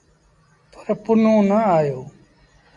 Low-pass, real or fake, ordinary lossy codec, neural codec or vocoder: 10.8 kHz; real; AAC, 64 kbps; none